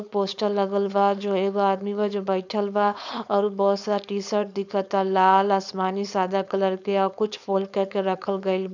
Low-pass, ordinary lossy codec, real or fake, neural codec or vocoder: 7.2 kHz; none; fake; codec, 16 kHz, 4.8 kbps, FACodec